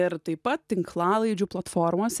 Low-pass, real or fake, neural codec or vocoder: 14.4 kHz; real; none